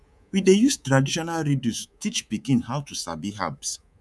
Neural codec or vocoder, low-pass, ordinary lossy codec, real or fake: codec, 24 kHz, 3.1 kbps, DualCodec; none; none; fake